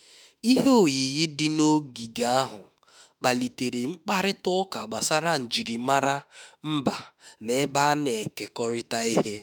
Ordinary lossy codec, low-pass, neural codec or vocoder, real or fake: none; none; autoencoder, 48 kHz, 32 numbers a frame, DAC-VAE, trained on Japanese speech; fake